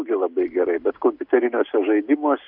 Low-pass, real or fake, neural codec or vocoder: 5.4 kHz; real; none